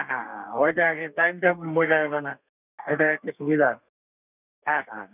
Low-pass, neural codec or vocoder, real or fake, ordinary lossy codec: 3.6 kHz; codec, 32 kHz, 1.9 kbps, SNAC; fake; none